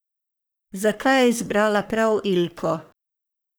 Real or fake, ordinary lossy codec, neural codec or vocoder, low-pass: fake; none; codec, 44.1 kHz, 3.4 kbps, Pupu-Codec; none